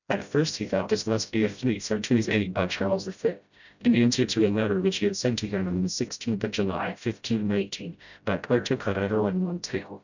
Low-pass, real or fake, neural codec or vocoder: 7.2 kHz; fake; codec, 16 kHz, 0.5 kbps, FreqCodec, smaller model